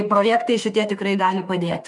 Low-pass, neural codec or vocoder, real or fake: 10.8 kHz; autoencoder, 48 kHz, 32 numbers a frame, DAC-VAE, trained on Japanese speech; fake